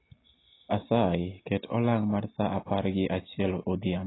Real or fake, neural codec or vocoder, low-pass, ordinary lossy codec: real; none; 7.2 kHz; AAC, 16 kbps